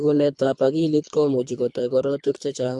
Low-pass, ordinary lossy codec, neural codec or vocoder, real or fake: 10.8 kHz; MP3, 64 kbps; codec, 24 kHz, 3 kbps, HILCodec; fake